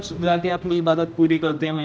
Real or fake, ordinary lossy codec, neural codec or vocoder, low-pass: fake; none; codec, 16 kHz, 1 kbps, X-Codec, HuBERT features, trained on general audio; none